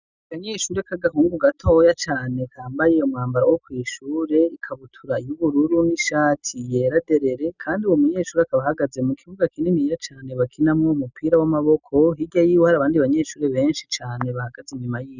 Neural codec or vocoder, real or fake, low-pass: none; real; 7.2 kHz